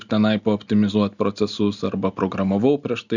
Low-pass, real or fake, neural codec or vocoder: 7.2 kHz; real; none